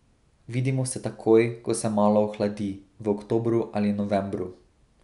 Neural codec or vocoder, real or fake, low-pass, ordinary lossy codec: none; real; 10.8 kHz; none